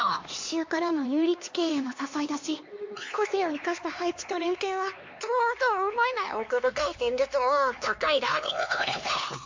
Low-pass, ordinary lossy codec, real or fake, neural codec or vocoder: 7.2 kHz; MP3, 48 kbps; fake; codec, 16 kHz, 2 kbps, X-Codec, HuBERT features, trained on LibriSpeech